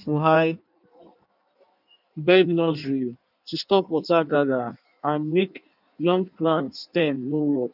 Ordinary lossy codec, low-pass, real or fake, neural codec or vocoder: none; 5.4 kHz; fake; codec, 16 kHz in and 24 kHz out, 1.1 kbps, FireRedTTS-2 codec